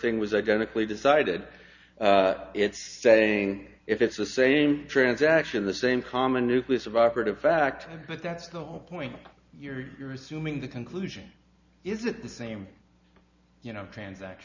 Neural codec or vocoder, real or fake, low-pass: none; real; 7.2 kHz